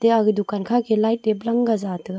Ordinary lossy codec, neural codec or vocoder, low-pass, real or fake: none; none; none; real